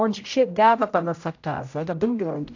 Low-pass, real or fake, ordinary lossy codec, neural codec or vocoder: 7.2 kHz; fake; AAC, 48 kbps; codec, 16 kHz, 0.5 kbps, X-Codec, HuBERT features, trained on general audio